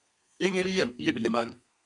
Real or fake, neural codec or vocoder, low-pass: fake; codec, 32 kHz, 1.9 kbps, SNAC; 10.8 kHz